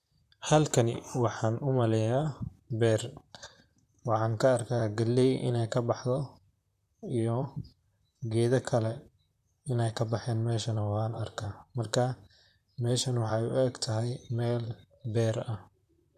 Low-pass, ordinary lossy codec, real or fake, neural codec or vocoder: 14.4 kHz; none; fake; vocoder, 44.1 kHz, 128 mel bands, Pupu-Vocoder